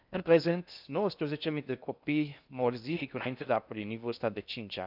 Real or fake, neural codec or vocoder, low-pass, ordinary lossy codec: fake; codec, 16 kHz in and 24 kHz out, 0.6 kbps, FocalCodec, streaming, 2048 codes; 5.4 kHz; none